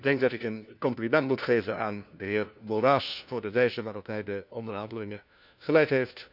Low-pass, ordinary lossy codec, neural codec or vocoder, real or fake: 5.4 kHz; none; codec, 16 kHz, 1 kbps, FunCodec, trained on LibriTTS, 50 frames a second; fake